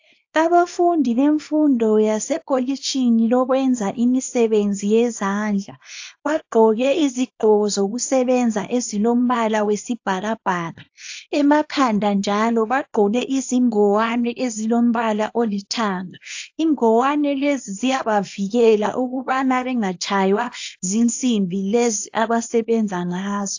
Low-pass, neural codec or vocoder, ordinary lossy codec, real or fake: 7.2 kHz; codec, 24 kHz, 0.9 kbps, WavTokenizer, small release; AAC, 48 kbps; fake